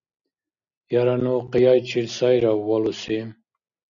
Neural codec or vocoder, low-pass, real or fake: none; 7.2 kHz; real